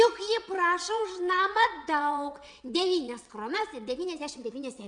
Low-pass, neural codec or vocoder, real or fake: 9.9 kHz; vocoder, 22.05 kHz, 80 mel bands, WaveNeXt; fake